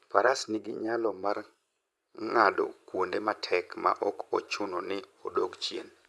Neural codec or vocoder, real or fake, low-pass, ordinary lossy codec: vocoder, 24 kHz, 100 mel bands, Vocos; fake; none; none